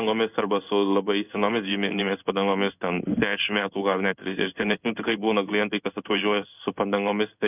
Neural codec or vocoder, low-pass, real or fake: codec, 16 kHz in and 24 kHz out, 1 kbps, XY-Tokenizer; 3.6 kHz; fake